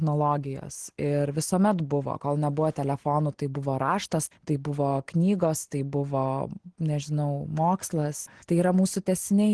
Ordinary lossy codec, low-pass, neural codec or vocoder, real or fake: Opus, 16 kbps; 10.8 kHz; none; real